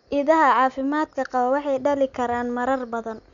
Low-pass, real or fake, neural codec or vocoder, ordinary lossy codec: 7.2 kHz; real; none; none